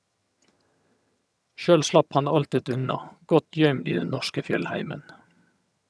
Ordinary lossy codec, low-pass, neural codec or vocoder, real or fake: none; none; vocoder, 22.05 kHz, 80 mel bands, HiFi-GAN; fake